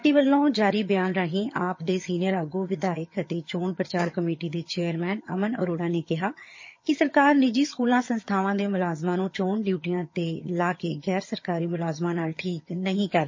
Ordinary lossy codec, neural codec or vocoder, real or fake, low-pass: MP3, 32 kbps; vocoder, 22.05 kHz, 80 mel bands, HiFi-GAN; fake; 7.2 kHz